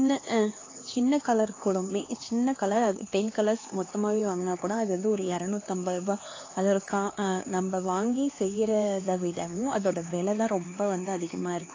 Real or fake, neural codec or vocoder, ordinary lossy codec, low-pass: fake; codec, 16 kHz, 4 kbps, X-Codec, HuBERT features, trained on LibriSpeech; AAC, 32 kbps; 7.2 kHz